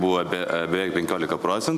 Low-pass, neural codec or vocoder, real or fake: 14.4 kHz; none; real